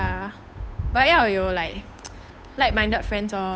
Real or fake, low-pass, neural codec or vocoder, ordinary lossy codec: fake; none; codec, 16 kHz, 8 kbps, FunCodec, trained on Chinese and English, 25 frames a second; none